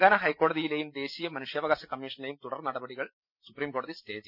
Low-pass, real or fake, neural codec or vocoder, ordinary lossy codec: 5.4 kHz; real; none; none